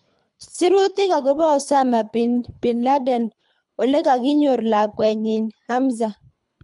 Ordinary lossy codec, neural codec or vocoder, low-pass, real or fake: MP3, 64 kbps; codec, 24 kHz, 3 kbps, HILCodec; 10.8 kHz; fake